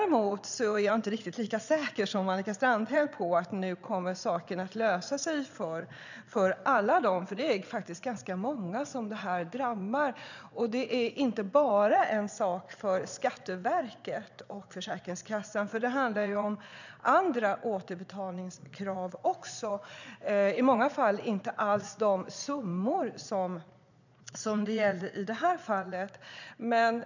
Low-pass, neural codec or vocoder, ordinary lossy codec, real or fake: 7.2 kHz; vocoder, 22.05 kHz, 80 mel bands, Vocos; none; fake